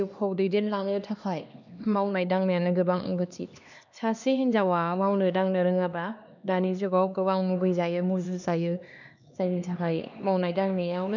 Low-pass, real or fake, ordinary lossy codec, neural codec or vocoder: 7.2 kHz; fake; none; codec, 16 kHz, 2 kbps, X-Codec, HuBERT features, trained on LibriSpeech